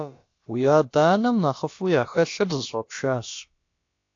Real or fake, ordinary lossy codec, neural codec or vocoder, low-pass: fake; AAC, 48 kbps; codec, 16 kHz, about 1 kbps, DyCAST, with the encoder's durations; 7.2 kHz